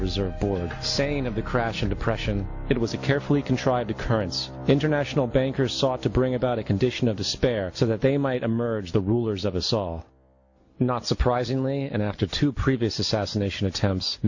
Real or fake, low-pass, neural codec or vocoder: real; 7.2 kHz; none